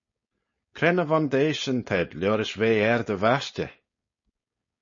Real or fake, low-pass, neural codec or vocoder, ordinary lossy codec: fake; 7.2 kHz; codec, 16 kHz, 4.8 kbps, FACodec; MP3, 32 kbps